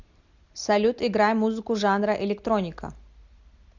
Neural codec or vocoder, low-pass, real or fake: none; 7.2 kHz; real